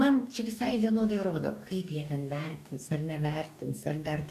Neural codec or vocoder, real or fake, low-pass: codec, 44.1 kHz, 2.6 kbps, DAC; fake; 14.4 kHz